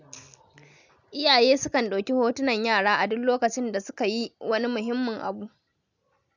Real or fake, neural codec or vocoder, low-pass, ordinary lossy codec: real; none; 7.2 kHz; none